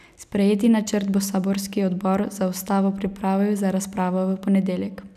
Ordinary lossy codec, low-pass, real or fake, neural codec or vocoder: none; 14.4 kHz; real; none